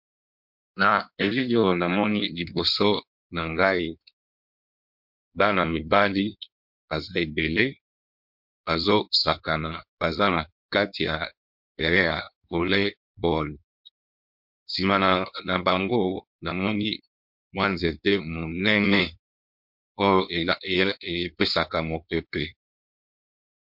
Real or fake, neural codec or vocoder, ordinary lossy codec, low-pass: fake; codec, 16 kHz in and 24 kHz out, 1.1 kbps, FireRedTTS-2 codec; MP3, 48 kbps; 5.4 kHz